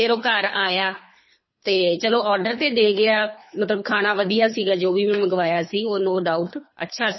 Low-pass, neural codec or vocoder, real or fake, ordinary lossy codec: 7.2 kHz; codec, 24 kHz, 3 kbps, HILCodec; fake; MP3, 24 kbps